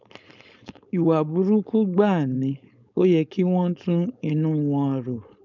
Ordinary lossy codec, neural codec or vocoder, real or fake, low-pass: none; codec, 16 kHz, 4.8 kbps, FACodec; fake; 7.2 kHz